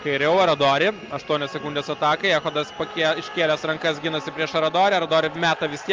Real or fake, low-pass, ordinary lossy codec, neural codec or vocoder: real; 7.2 kHz; Opus, 24 kbps; none